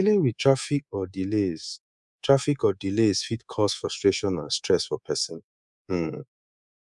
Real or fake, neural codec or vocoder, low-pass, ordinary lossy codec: fake; codec, 24 kHz, 3.1 kbps, DualCodec; 10.8 kHz; none